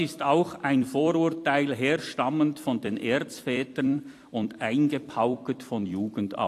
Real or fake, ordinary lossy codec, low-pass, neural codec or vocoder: fake; AAC, 64 kbps; 14.4 kHz; vocoder, 44.1 kHz, 128 mel bands every 256 samples, BigVGAN v2